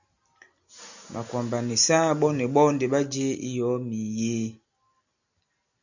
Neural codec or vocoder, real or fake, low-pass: none; real; 7.2 kHz